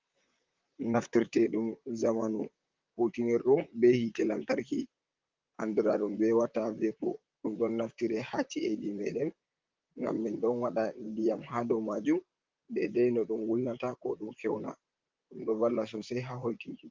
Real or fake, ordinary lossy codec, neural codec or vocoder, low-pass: fake; Opus, 32 kbps; vocoder, 44.1 kHz, 128 mel bands, Pupu-Vocoder; 7.2 kHz